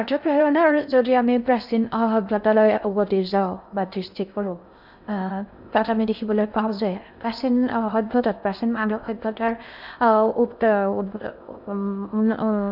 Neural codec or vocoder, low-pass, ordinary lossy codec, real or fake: codec, 16 kHz in and 24 kHz out, 0.6 kbps, FocalCodec, streaming, 4096 codes; 5.4 kHz; none; fake